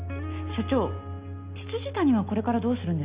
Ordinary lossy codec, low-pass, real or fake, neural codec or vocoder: Opus, 64 kbps; 3.6 kHz; real; none